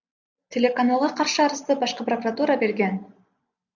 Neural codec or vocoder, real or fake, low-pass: none; real; 7.2 kHz